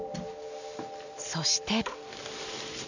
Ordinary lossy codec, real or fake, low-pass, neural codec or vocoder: none; real; 7.2 kHz; none